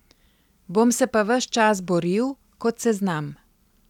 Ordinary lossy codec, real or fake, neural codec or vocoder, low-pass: none; real; none; 19.8 kHz